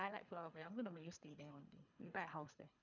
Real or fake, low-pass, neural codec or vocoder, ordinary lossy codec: fake; 7.2 kHz; codec, 24 kHz, 3 kbps, HILCodec; none